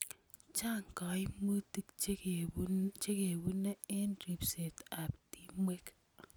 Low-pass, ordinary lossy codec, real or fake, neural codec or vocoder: none; none; real; none